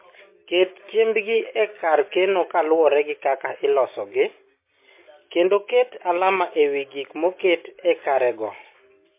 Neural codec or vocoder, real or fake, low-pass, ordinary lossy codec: none; real; 3.6 kHz; MP3, 24 kbps